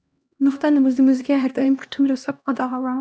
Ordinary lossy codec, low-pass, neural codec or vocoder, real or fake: none; none; codec, 16 kHz, 1 kbps, X-Codec, HuBERT features, trained on LibriSpeech; fake